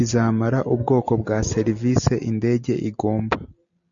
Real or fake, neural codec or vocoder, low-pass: real; none; 7.2 kHz